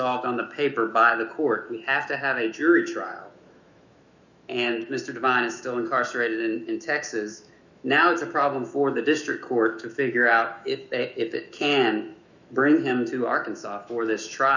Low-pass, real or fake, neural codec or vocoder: 7.2 kHz; fake; autoencoder, 48 kHz, 128 numbers a frame, DAC-VAE, trained on Japanese speech